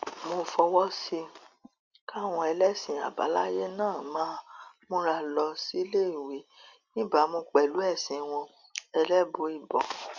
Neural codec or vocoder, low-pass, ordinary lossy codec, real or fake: none; 7.2 kHz; Opus, 64 kbps; real